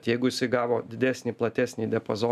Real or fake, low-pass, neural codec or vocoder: real; 14.4 kHz; none